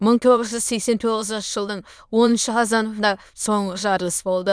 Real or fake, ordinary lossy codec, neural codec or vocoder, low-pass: fake; none; autoencoder, 22.05 kHz, a latent of 192 numbers a frame, VITS, trained on many speakers; none